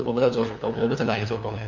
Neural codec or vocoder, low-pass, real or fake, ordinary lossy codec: codec, 16 kHz, 2 kbps, FunCodec, trained on LibriTTS, 25 frames a second; 7.2 kHz; fake; none